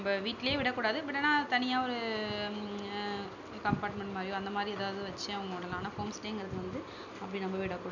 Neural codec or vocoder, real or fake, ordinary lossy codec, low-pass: none; real; none; 7.2 kHz